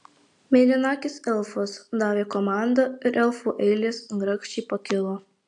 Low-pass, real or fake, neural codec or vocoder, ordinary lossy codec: 10.8 kHz; real; none; AAC, 64 kbps